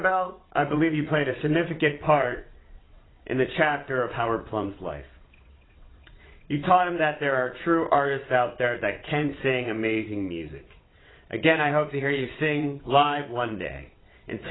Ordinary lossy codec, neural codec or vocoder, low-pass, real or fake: AAC, 16 kbps; vocoder, 22.05 kHz, 80 mel bands, WaveNeXt; 7.2 kHz; fake